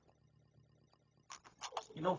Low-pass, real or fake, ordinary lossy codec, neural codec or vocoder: 7.2 kHz; fake; AAC, 32 kbps; codec, 16 kHz, 0.4 kbps, LongCat-Audio-Codec